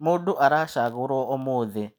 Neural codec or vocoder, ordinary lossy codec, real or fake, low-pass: none; none; real; none